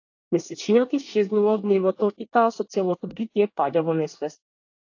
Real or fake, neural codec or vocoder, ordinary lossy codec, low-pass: fake; codec, 24 kHz, 1 kbps, SNAC; AAC, 48 kbps; 7.2 kHz